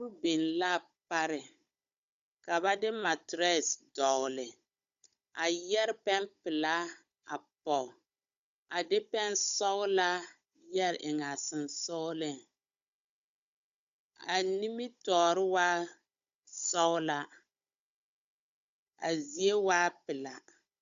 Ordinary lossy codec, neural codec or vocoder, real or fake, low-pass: Opus, 64 kbps; codec, 16 kHz, 16 kbps, FunCodec, trained on Chinese and English, 50 frames a second; fake; 7.2 kHz